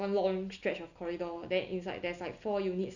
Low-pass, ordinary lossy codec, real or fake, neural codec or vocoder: 7.2 kHz; none; real; none